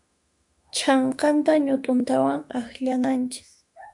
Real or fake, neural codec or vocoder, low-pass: fake; autoencoder, 48 kHz, 32 numbers a frame, DAC-VAE, trained on Japanese speech; 10.8 kHz